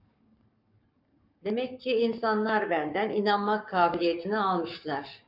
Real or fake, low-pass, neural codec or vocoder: fake; 5.4 kHz; codec, 44.1 kHz, 7.8 kbps, Pupu-Codec